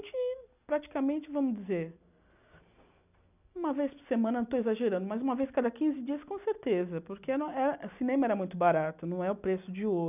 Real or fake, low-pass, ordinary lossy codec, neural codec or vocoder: real; 3.6 kHz; none; none